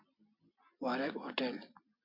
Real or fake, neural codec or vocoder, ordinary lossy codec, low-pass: real; none; MP3, 32 kbps; 5.4 kHz